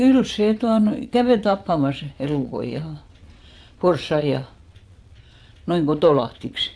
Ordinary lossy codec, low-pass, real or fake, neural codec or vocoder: none; none; fake; vocoder, 22.05 kHz, 80 mel bands, Vocos